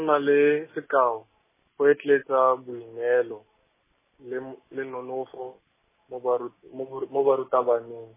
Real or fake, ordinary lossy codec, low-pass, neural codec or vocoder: real; MP3, 16 kbps; 3.6 kHz; none